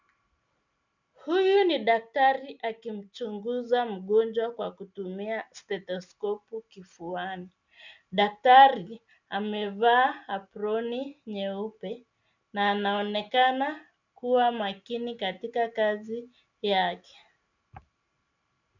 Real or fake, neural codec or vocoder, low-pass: real; none; 7.2 kHz